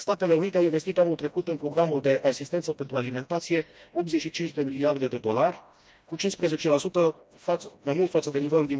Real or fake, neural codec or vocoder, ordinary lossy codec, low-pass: fake; codec, 16 kHz, 1 kbps, FreqCodec, smaller model; none; none